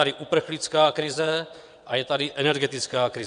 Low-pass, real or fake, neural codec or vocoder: 9.9 kHz; fake; vocoder, 22.05 kHz, 80 mel bands, WaveNeXt